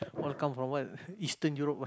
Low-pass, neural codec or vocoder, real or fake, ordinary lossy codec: none; none; real; none